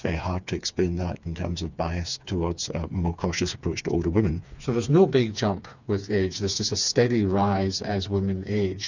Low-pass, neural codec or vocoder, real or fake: 7.2 kHz; codec, 16 kHz, 4 kbps, FreqCodec, smaller model; fake